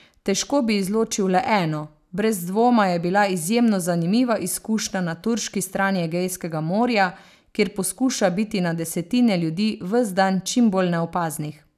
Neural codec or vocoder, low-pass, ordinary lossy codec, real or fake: none; 14.4 kHz; none; real